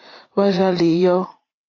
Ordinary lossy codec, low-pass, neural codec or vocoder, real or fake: AAC, 32 kbps; 7.2 kHz; vocoder, 44.1 kHz, 80 mel bands, Vocos; fake